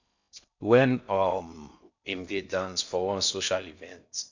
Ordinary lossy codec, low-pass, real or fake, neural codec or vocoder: none; 7.2 kHz; fake; codec, 16 kHz in and 24 kHz out, 0.6 kbps, FocalCodec, streaming, 4096 codes